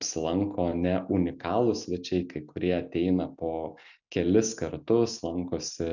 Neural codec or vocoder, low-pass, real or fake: none; 7.2 kHz; real